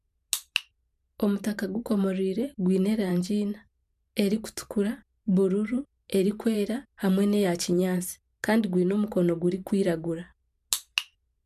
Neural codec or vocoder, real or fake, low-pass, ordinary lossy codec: vocoder, 44.1 kHz, 128 mel bands every 512 samples, BigVGAN v2; fake; 14.4 kHz; MP3, 96 kbps